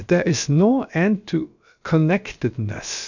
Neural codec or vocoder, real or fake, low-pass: codec, 16 kHz, about 1 kbps, DyCAST, with the encoder's durations; fake; 7.2 kHz